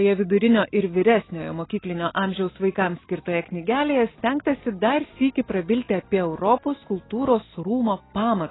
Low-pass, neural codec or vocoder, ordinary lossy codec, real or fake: 7.2 kHz; none; AAC, 16 kbps; real